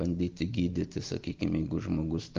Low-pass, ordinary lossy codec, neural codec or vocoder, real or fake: 7.2 kHz; Opus, 24 kbps; none; real